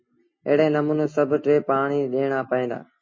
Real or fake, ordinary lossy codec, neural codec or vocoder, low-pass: real; MP3, 32 kbps; none; 7.2 kHz